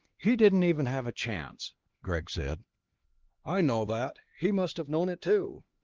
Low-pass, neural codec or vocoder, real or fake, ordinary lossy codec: 7.2 kHz; codec, 16 kHz, 2 kbps, X-Codec, HuBERT features, trained on LibriSpeech; fake; Opus, 16 kbps